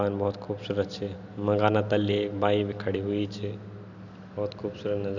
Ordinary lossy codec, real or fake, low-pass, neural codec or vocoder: none; real; 7.2 kHz; none